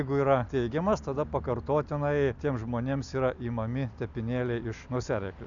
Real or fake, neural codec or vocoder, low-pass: real; none; 7.2 kHz